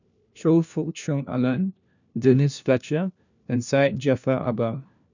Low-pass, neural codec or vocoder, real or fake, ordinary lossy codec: 7.2 kHz; codec, 16 kHz, 1 kbps, FunCodec, trained on LibriTTS, 50 frames a second; fake; none